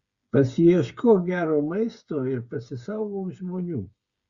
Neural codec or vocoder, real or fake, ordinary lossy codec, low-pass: codec, 16 kHz, 8 kbps, FreqCodec, smaller model; fake; Opus, 64 kbps; 7.2 kHz